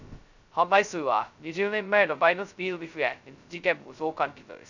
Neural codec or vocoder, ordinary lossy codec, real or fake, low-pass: codec, 16 kHz, 0.2 kbps, FocalCodec; none; fake; 7.2 kHz